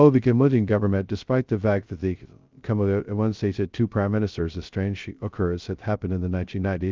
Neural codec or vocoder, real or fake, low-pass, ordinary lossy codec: codec, 16 kHz, 0.2 kbps, FocalCodec; fake; 7.2 kHz; Opus, 24 kbps